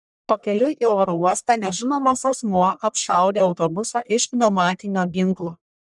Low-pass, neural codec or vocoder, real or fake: 10.8 kHz; codec, 44.1 kHz, 1.7 kbps, Pupu-Codec; fake